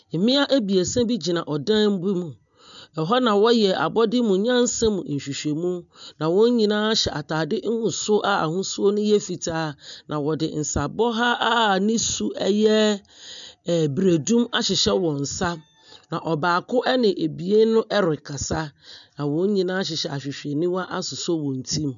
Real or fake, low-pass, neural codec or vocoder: real; 7.2 kHz; none